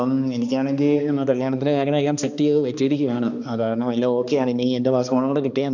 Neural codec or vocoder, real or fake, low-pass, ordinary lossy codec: codec, 16 kHz, 2 kbps, X-Codec, HuBERT features, trained on balanced general audio; fake; 7.2 kHz; none